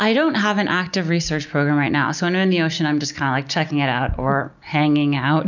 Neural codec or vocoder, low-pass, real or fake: none; 7.2 kHz; real